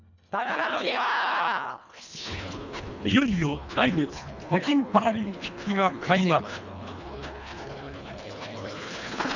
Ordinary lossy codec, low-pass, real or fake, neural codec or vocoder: none; 7.2 kHz; fake; codec, 24 kHz, 1.5 kbps, HILCodec